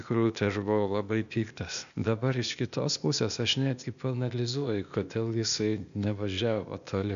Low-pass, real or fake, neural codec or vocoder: 7.2 kHz; fake; codec, 16 kHz, 0.8 kbps, ZipCodec